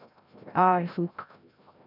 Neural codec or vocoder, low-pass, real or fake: codec, 16 kHz, 0.5 kbps, FreqCodec, larger model; 5.4 kHz; fake